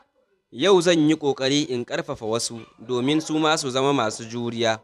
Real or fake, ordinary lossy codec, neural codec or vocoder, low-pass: fake; none; vocoder, 44.1 kHz, 128 mel bands every 512 samples, BigVGAN v2; 14.4 kHz